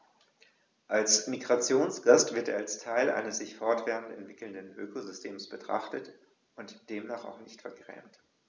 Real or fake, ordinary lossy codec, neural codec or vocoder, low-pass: real; none; none; none